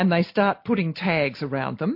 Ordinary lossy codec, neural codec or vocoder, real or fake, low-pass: MP3, 32 kbps; none; real; 5.4 kHz